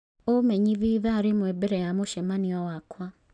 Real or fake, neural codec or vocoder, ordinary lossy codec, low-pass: real; none; none; 9.9 kHz